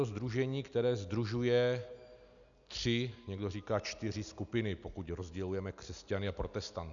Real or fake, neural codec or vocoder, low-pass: real; none; 7.2 kHz